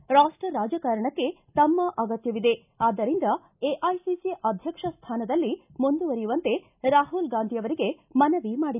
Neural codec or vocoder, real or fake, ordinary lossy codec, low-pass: none; real; none; 3.6 kHz